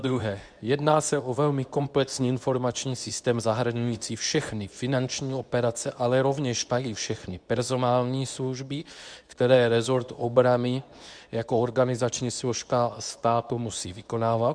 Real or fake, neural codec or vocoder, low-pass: fake; codec, 24 kHz, 0.9 kbps, WavTokenizer, medium speech release version 2; 9.9 kHz